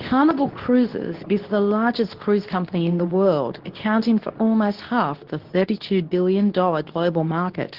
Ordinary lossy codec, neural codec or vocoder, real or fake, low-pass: Opus, 16 kbps; codec, 24 kHz, 0.9 kbps, WavTokenizer, medium speech release version 2; fake; 5.4 kHz